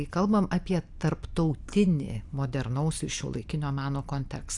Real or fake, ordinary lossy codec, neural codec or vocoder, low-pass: real; AAC, 64 kbps; none; 10.8 kHz